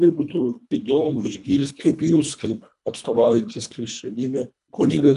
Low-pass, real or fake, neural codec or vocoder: 10.8 kHz; fake; codec, 24 kHz, 1.5 kbps, HILCodec